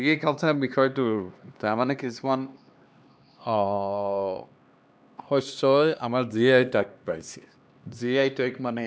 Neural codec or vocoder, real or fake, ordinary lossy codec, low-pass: codec, 16 kHz, 2 kbps, X-Codec, HuBERT features, trained on LibriSpeech; fake; none; none